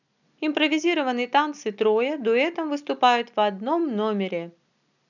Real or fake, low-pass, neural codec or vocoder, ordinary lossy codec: real; 7.2 kHz; none; none